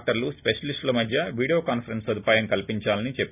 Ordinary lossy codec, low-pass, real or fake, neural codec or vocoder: none; 3.6 kHz; real; none